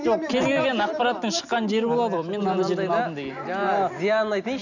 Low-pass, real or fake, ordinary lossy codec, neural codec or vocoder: 7.2 kHz; real; none; none